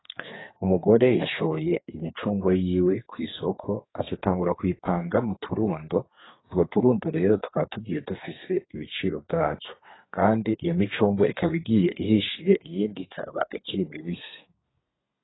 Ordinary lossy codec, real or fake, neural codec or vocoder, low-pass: AAC, 16 kbps; fake; codec, 32 kHz, 1.9 kbps, SNAC; 7.2 kHz